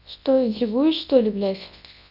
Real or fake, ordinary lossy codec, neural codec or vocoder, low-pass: fake; none; codec, 24 kHz, 0.9 kbps, WavTokenizer, large speech release; 5.4 kHz